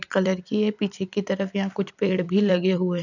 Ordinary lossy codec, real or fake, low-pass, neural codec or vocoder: none; fake; 7.2 kHz; vocoder, 44.1 kHz, 128 mel bands every 256 samples, BigVGAN v2